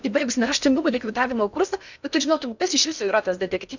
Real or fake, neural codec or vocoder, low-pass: fake; codec, 16 kHz in and 24 kHz out, 0.8 kbps, FocalCodec, streaming, 65536 codes; 7.2 kHz